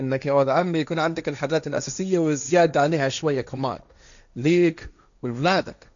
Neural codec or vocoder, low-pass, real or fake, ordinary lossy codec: codec, 16 kHz, 1.1 kbps, Voila-Tokenizer; 7.2 kHz; fake; none